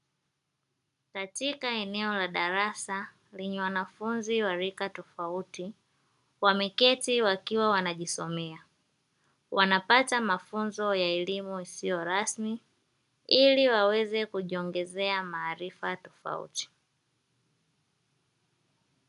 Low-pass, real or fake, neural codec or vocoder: 10.8 kHz; real; none